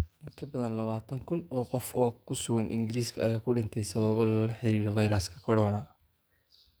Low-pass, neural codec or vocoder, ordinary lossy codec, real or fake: none; codec, 44.1 kHz, 2.6 kbps, SNAC; none; fake